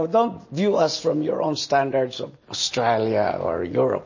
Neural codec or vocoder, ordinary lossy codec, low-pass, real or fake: none; MP3, 32 kbps; 7.2 kHz; real